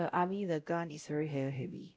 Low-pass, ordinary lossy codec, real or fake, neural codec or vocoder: none; none; fake; codec, 16 kHz, 0.5 kbps, X-Codec, WavLM features, trained on Multilingual LibriSpeech